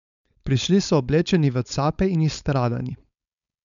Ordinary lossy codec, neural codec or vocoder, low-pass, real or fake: none; codec, 16 kHz, 4.8 kbps, FACodec; 7.2 kHz; fake